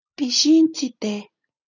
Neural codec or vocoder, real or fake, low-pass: none; real; 7.2 kHz